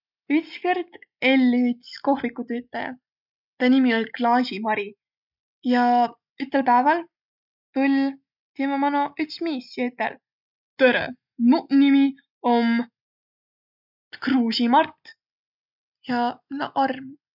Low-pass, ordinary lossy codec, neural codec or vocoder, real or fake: 5.4 kHz; none; none; real